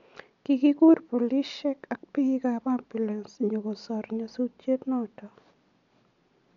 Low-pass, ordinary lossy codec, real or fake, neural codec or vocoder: 7.2 kHz; none; real; none